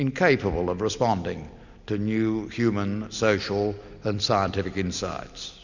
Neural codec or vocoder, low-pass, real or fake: none; 7.2 kHz; real